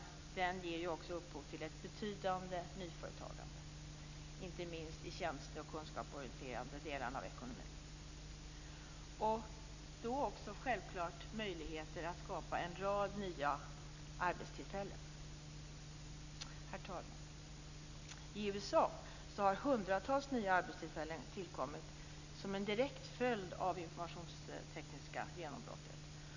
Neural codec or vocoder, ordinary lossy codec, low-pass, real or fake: none; none; 7.2 kHz; real